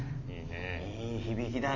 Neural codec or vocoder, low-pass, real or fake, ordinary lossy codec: none; 7.2 kHz; real; none